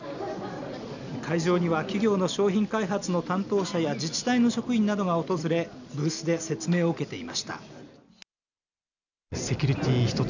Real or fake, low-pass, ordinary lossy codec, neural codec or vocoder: real; 7.2 kHz; none; none